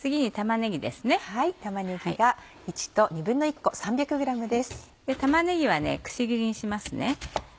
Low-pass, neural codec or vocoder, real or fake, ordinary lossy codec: none; none; real; none